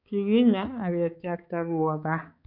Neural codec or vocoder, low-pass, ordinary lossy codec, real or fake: codec, 16 kHz, 4 kbps, X-Codec, HuBERT features, trained on balanced general audio; 5.4 kHz; none; fake